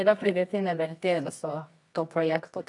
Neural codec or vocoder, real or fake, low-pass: codec, 24 kHz, 0.9 kbps, WavTokenizer, medium music audio release; fake; 10.8 kHz